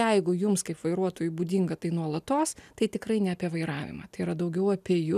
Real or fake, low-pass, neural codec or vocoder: real; 14.4 kHz; none